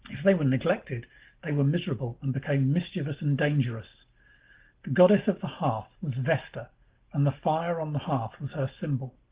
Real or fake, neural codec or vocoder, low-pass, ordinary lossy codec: real; none; 3.6 kHz; Opus, 16 kbps